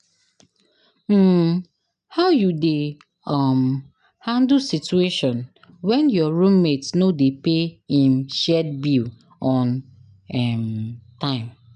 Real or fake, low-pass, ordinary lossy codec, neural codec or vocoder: real; 9.9 kHz; none; none